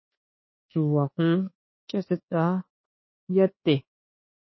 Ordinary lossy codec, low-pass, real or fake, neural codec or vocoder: MP3, 24 kbps; 7.2 kHz; fake; codec, 24 kHz, 1.2 kbps, DualCodec